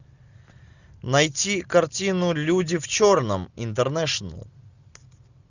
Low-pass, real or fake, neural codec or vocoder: 7.2 kHz; real; none